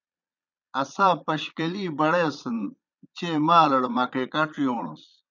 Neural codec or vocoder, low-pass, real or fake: vocoder, 22.05 kHz, 80 mel bands, Vocos; 7.2 kHz; fake